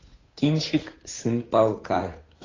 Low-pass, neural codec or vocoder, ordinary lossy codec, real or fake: 7.2 kHz; codec, 16 kHz, 8 kbps, FunCodec, trained on Chinese and English, 25 frames a second; AAC, 32 kbps; fake